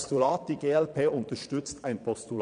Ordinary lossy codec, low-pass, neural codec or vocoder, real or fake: none; 9.9 kHz; vocoder, 22.05 kHz, 80 mel bands, Vocos; fake